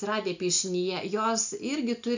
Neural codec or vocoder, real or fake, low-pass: none; real; 7.2 kHz